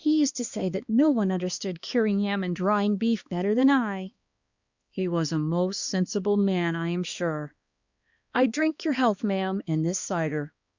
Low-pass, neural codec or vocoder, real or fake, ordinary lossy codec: 7.2 kHz; codec, 16 kHz, 2 kbps, X-Codec, HuBERT features, trained on balanced general audio; fake; Opus, 64 kbps